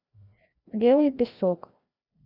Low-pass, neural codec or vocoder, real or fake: 5.4 kHz; codec, 16 kHz, 1 kbps, FreqCodec, larger model; fake